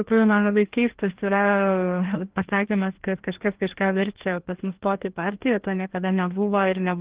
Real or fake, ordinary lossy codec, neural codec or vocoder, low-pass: fake; Opus, 16 kbps; codec, 16 kHz, 1 kbps, FreqCodec, larger model; 3.6 kHz